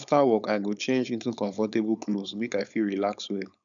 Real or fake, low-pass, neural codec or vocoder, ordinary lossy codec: fake; 7.2 kHz; codec, 16 kHz, 4.8 kbps, FACodec; none